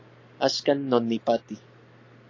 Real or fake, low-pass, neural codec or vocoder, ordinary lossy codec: real; 7.2 kHz; none; AAC, 48 kbps